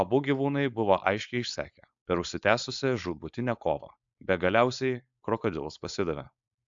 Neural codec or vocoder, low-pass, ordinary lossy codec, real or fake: codec, 16 kHz, 4.8 kbps, FACodec; 7.2 kHz; AAC, 64 kbps; fake